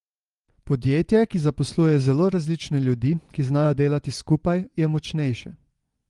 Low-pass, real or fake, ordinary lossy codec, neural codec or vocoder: 10.8 kHz; fake; Opus, 24 kbps; vocoder, 24 kHz, 100 mel bands, Vocos